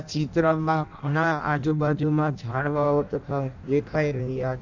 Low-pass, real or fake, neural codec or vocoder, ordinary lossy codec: 7.2 kHz; fake; codec, 16 kHz in and 24 kHz out, 0.6 kbps, FireRedTTS-2 codec; none